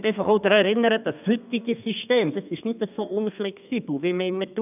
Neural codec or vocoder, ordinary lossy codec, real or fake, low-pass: codec, 32 kHz, 1.9 kbps, SNAC; none; fake; 3.6 kHz